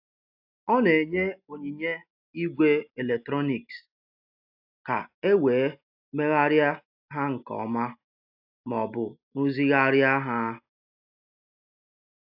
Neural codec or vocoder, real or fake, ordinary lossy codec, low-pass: none; real; AAC, 48 kbps; 5.4 kHz